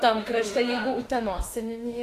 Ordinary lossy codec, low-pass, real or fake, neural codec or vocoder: AAC, 48 kbps; 14.4 kHz; fake; autoencoder, 48 kHz, 32 numbers a frame, DAC-VAE, trained on Japanese speech